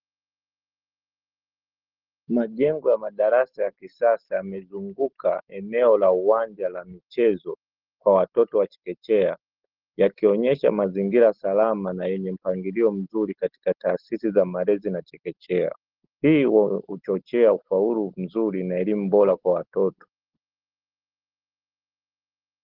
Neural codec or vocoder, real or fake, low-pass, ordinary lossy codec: none; real; 5.4 kHz; Opus, 16 kbps